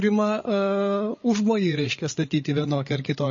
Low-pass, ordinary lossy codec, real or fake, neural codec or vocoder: 7.2 kHz; MP3, 32 kbps; fake; codec, 16 kHz, 8 kbps, FreqCodec, larger model